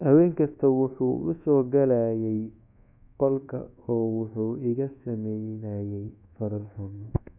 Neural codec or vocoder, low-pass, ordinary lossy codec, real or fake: codec, 24 kHz, 1.2 kbps, DualCodec; 3.6 kHz; none; fake